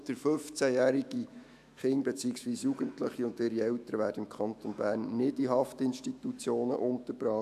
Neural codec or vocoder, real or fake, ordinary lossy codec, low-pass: autoencoder, 48 kHz, 128 numbers a frame, DAC-VAE, trained on Japanese speech; fake; AAC, 96 kbps; 14.4 kHz